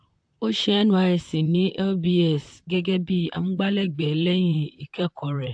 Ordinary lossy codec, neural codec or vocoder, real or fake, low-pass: AAC, 64 kbps; vocoder, 44.1 kHz, 128 mel bands, Pupu-Vocoder; fake; 9.9 kHz